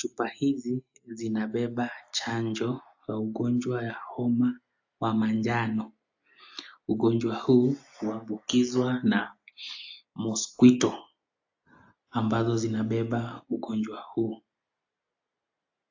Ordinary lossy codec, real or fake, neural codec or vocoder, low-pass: AAC, 48 kbps; real; none; 7.2 kHz